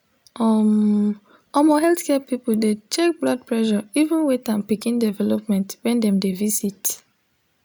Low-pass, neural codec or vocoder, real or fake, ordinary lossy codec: none; none; real; none